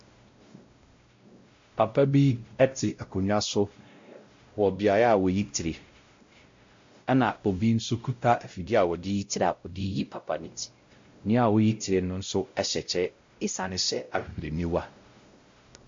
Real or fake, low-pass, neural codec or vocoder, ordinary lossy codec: fake; 7.2 kHz; codec, 16 kHz, 0.5 kbps, X-Codec, WavLM features, trained on Multilingual LibriSpeech; MP3, 48 kbps